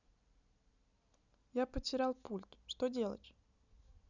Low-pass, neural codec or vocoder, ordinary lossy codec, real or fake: 7.2 kHz; none; none; real